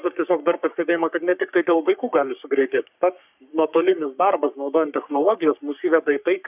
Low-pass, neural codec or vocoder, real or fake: 3.6 kHz; codec, 44.1 kHz, 3.4 kbps, Pupu-Codec; fake